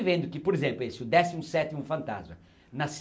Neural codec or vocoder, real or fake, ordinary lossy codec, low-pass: none; real; none; none